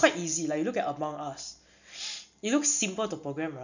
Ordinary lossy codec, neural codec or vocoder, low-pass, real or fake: none; none; 7.2 kHz; real